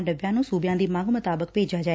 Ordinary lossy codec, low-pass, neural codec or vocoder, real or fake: none; none; none; real